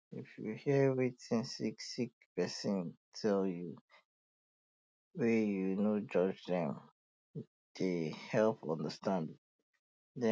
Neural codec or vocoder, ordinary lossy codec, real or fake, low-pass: none; none; real; none